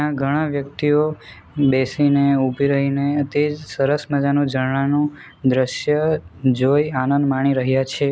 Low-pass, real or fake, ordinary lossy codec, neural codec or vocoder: none; real; none; none